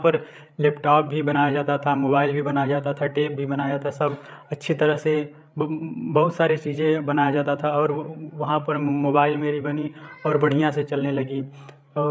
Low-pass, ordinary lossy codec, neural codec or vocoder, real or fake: none; none; codec, 16 kHz, 8 kbps, FreqCodec, larger model; fake